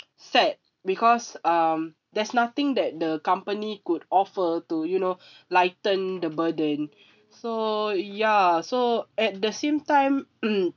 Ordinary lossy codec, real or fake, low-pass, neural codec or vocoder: none; real; 7.2 kHz; none